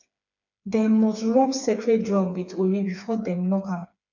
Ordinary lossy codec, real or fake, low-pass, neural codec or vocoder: none; fake; 7.2 kHz; codec, 16 kHz, 4 kbps, FreqCodec, smaller model